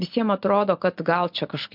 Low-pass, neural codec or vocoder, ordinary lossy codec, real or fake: 5.4 kHz; none; MP3, 48 kbps; real